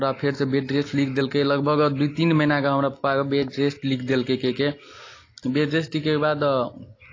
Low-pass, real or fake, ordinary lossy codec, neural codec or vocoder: 7.2 kHz; real; AAC, 32 kbps; none